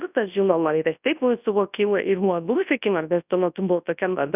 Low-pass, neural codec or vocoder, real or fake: 3.6 kHz; codec, 24 kHz, 0.9 kbps, WavTokenizer, large speech release; fake